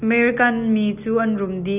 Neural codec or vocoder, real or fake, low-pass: none; real; 3.6 kHz